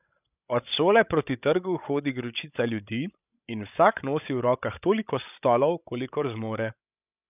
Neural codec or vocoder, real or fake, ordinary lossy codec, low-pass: codec, 16 kHz, 16 kbps, FreqCodec, larger model; fake; none; 3.6 kHz